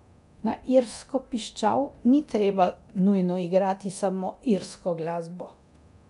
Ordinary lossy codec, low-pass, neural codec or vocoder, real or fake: none; 10.8 kHz; codec, 24 kHz, 0.9 kbps, DualCodec; fake